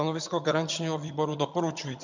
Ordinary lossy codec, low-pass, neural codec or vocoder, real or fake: MP3, 64 kbps; 7.2 kHz; vocoder, 22.05 kHz, 80 mel bands, HiFi-GAN; fake